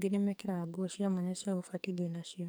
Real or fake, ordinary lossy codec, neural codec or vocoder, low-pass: fake; none; codec, 44.1 kHz, 2.6 kbps, SNAC; none